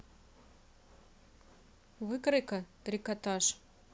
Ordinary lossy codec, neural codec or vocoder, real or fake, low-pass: none; none; real; none